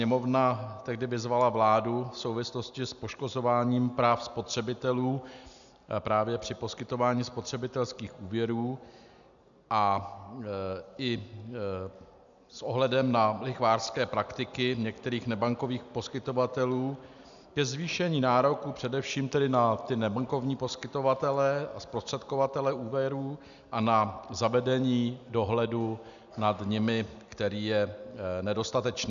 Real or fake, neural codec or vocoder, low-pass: real; none; 7.2 kHz